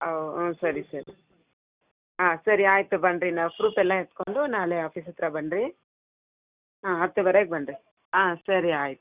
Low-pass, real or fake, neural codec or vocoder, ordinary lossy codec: 3.6 kHz; real; none; Opus, 64 kbps